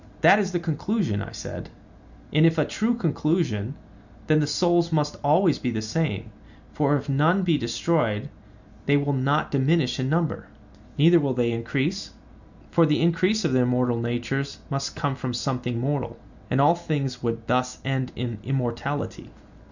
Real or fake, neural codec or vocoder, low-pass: real; none; 7.2 kHz